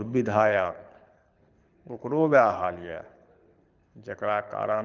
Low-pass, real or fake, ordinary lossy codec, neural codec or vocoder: 7.2 kHz; fake; Opus, 24 kbps; codec, 24 kHz, 6 kbps, HILCodec